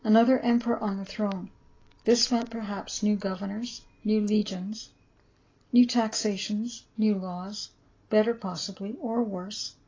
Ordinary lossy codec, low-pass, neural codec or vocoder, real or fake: AAC, 32 kbps; 7.2 kHz; none; real